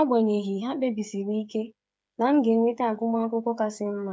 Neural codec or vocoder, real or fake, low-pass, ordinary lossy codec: codec, 16 kHz, 8 kbps, FreqCodec, smaller model; fake; none; none